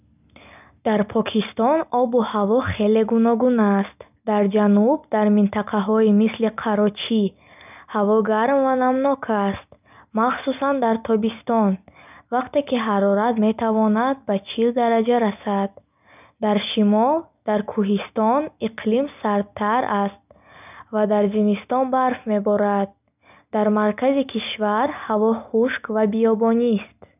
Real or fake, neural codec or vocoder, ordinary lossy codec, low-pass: real; none; none; 3.6 kHz